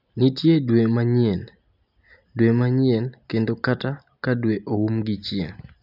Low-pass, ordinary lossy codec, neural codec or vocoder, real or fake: 5.4 kHz; none; none; real